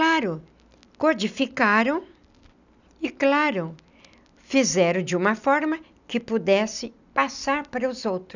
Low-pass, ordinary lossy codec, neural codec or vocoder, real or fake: 7.2 kHz; none; none; real